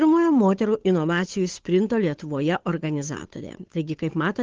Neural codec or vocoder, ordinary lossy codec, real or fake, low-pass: codec, 16 kHz, 8 kbps, FunCodec, trained on Chinese and English, 25 frames a second; Opus, 16 kbps; fake; 7.2 kHz